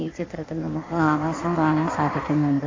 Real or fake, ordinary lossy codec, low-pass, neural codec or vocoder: fake; AAC, 32 kbps; 7.2 kHz; codec, 16 kHz in and 24 kHz out, 1.1 kbps, FireRedTTS-2 codec